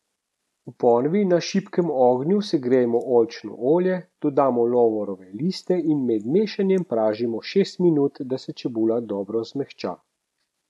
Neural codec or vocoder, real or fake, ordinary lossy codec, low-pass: none; real; none; none